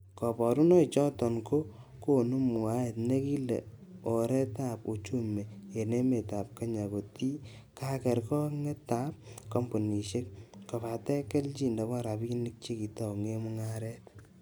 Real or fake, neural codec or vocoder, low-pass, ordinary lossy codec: real; none; none; none